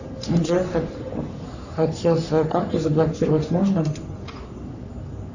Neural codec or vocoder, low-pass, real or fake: codec, 44.1 kHz, 3.4 kbps, Pupu-Codec; 7.2 kHz; fake